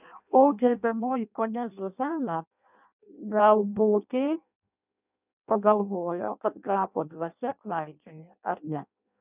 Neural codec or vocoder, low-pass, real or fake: codec, 16 kHz in and 24 kHz out, 0.6 kbps, FireRedTTS-2 codec; 3.6 kHz; fake